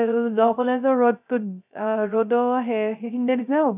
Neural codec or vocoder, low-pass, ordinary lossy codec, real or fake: codec, 16 kHz, 0.3 kbps, FocalCodec; 3.6 kHz; none; fake